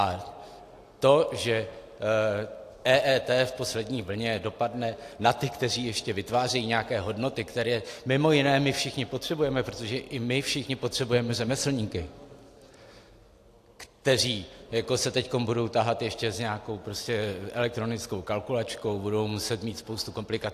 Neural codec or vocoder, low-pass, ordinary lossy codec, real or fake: none; 14.4 kHz; AAC, 64 kbps; real